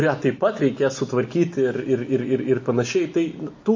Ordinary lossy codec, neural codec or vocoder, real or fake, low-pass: MP3, 32 kbps; none; real; 7.2 kHz